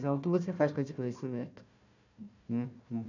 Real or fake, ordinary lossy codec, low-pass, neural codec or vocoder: fake; none; 7.2 kHz; codec, 16 kHz, 1 kbps, FunCodec, trained on Chinese and English, 50 frames a second